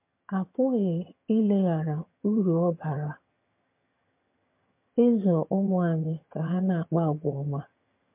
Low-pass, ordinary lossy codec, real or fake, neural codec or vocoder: 3.6 kHz; MP3, 32 kbps; fake; vocoder, 22.05 kHz, 80 mel bands, HiFi-GAN